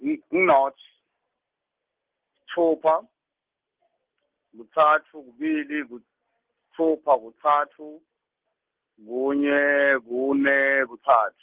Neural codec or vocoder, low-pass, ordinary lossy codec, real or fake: none; 3.6 kHz; Opus, 16 kbps; real